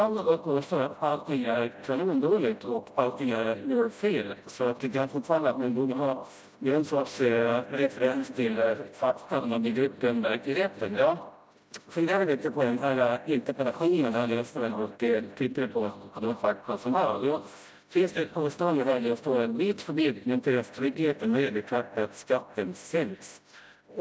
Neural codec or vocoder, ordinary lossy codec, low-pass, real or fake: codec, 16 kHz, 0.5 kbps, FreqCodec, smaller model; none; none; fake